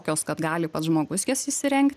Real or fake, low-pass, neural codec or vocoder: real; 14.4 kHz; none